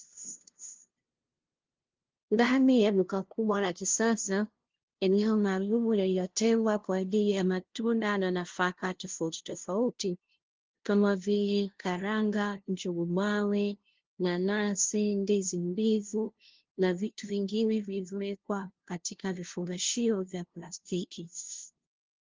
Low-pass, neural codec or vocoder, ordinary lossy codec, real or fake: 7.2 kHz; codec, 16 kHz, 0.5 kbps, FunCodec, trained on LibriTTS, 25 frames a second; Opus, 16 kbps; fake